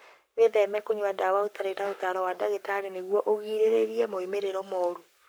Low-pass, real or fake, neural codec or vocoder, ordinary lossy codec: none; fake; codec, 44.1 kHz, 7.8 kbps, Pupu-Codec; none